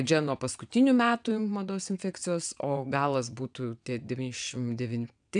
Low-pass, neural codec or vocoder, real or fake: 9.9 kHz; vocoder, 22.05 kHz, 80 mel bands, WaveNeXt; fake